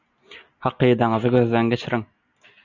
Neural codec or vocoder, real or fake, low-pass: none; real; 7.2 kHz